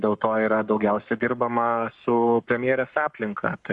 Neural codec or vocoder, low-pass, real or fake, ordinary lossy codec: codec, 44.1 kHz, 7.8 kbps, Pupu-Codec; 10.8 kHz; fake; Opus, 64 kbps